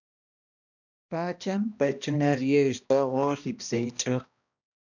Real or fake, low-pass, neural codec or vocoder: fake; 7.2 kHz; codec, 16 kHz, 1 kbps, X-Codec, HuBERT features, trained on balanced general audio